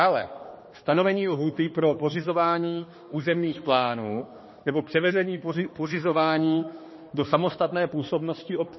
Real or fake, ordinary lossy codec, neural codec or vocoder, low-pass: fake; MP3, 24 kbps; codec, 16 kHz, 2 kbps, X-Codec, HuBERT features, trained on balanced general audio; 7.2 kHz